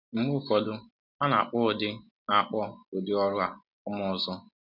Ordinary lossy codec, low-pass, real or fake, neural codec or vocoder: none; 5.4 kHz; real; none